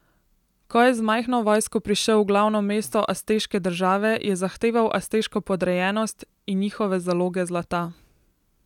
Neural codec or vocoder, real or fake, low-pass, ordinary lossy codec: none; real; 19.8 kHz; none